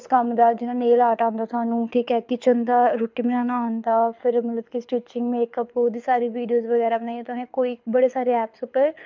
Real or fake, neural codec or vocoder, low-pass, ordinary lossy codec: fake; codec, 24 kHz, 6 kbps, HILCodec; 7.2 kHz; MP3, 64 kbps